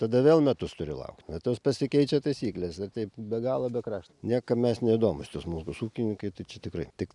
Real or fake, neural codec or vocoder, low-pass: real; none; 10.8 kHz